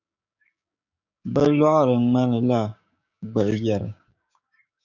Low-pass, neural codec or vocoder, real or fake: 7.2 kHz; codec, 16 kHz, 6 kbps, DAC; fake